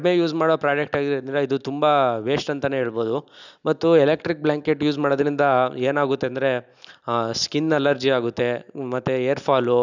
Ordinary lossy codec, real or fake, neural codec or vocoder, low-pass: none; real; none; 7.2 kHz